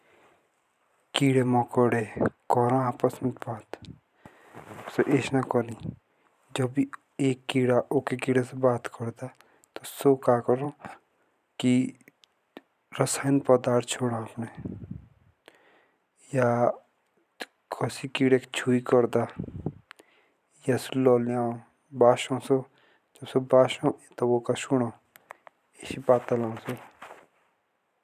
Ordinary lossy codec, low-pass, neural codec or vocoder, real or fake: none; 14.4 kHz; vocoder, 44.1 kHz, 128 mel bands every 512 samples, BigVGAN v2; fake